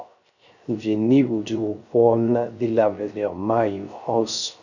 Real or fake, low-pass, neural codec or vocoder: fake; 7.2 kHz; codec, 16 kHz, 0.3 kbps, FocalCodec